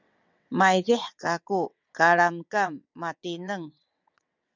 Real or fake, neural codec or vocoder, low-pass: fake; vocoder, 22.05 kHz, 80 mel bands, WaveNeXt; 7.2 kHz